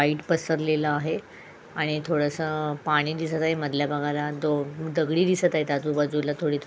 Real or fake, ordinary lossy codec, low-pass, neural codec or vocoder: real; none; none; none